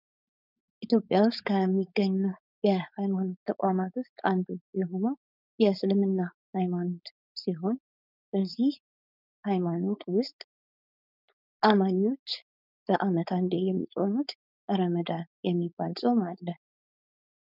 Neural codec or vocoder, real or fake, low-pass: codec, 16 kHz, 4.8 kbps, FACodec; fake; 5.4 kHz